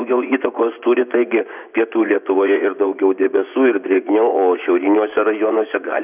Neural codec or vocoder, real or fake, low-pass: vocoder, 24 kHz, 100 mel bands, Vocos; fake; 3.6 kHz